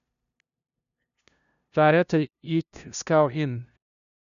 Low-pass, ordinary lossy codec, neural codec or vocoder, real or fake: 7.2 kHz; none; codec, 16 kHz, 0.5 kbps, FunCodec, trained on LibriTTS, 25 frames a second; fake